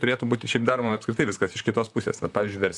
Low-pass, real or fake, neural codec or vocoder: 10.8 kHz; fake; vocoder, 44.1 kHz, 128 mel bands, Pupu-Vocoder